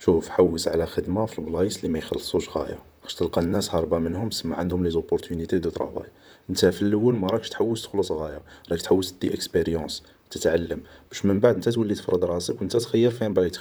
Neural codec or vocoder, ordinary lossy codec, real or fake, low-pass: vocoder, 44.1 kHz, 128 mel bands, Pupu-Vocoder; none; fake; none